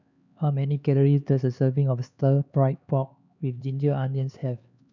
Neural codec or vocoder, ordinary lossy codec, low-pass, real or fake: codec, 16 kHz, 4 kbps, X-Codec, HuBERT features, trained on LibriSpeech; none; 7.2 kHz; fake